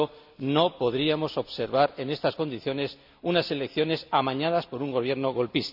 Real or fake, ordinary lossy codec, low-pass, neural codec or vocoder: real; none; 5.4 kHz; none